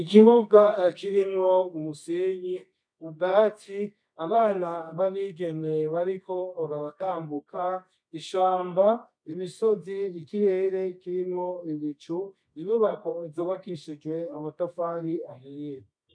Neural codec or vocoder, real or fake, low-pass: codec, 24 kHz, 0.9 kbps, WavTokenizer, medium music audio release; fake; 9.9 kHz